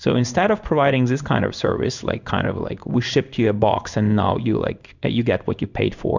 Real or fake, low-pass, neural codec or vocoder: fake; 7.2 kHz; codec, 16 kHz in and 24 kHz out, 1 kbps, XY-Tokenizer